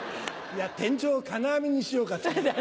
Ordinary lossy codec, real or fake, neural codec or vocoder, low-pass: none; real; none; none